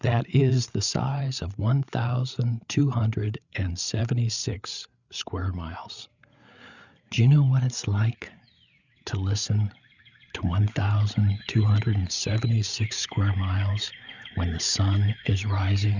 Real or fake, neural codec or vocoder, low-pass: fake; codec, 16 kHz, 8 kbps, FreqCodec, larger model; 7.2 kHz